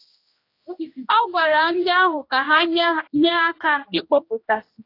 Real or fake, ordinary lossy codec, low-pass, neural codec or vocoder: fake; AAC, 32 kbps; 5.4 kHz; codec, 16 kHz, 1 kbps, X-Codec, HuBERT features, trained on general audio